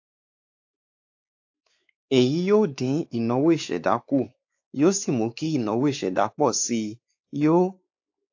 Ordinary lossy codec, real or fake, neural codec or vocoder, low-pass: AAC, 48 kbps; fake; codec, 16 kHz, 4 kbps, X-Codec, WavLM features, trained on Multilingual LibriSpeech; 7.2 kHz